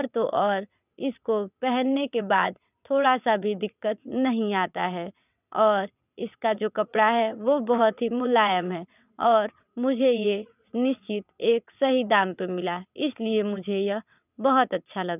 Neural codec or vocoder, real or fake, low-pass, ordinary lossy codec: vocoder, 22.05 kHz, 80 mel bands, WaveNeXt; fake; 3.6 kHz; none